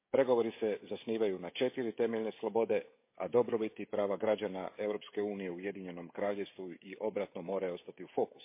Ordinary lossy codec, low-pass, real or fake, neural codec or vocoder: MP3, 24 kbps; 3.6 kHz; fake; codec, 16 kHz, 16 kbps, FreqCodec, smaller model